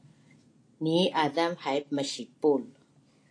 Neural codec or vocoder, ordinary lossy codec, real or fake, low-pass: none; AAC, 48 kbps; real; 9.9 kHz